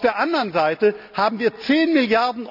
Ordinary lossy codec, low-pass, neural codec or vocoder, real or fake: none; 5.4 kHz; none; real